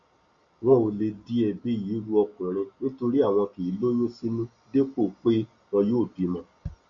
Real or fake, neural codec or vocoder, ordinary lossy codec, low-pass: real; none; Opus, 64 kbps; 7.2 kHz